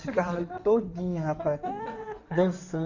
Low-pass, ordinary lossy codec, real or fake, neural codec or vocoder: 7.2 kHz; none; fake; codec, 16 kHz in and 24 kHz out, 2.2 kbps, FireRedTTS-2 codec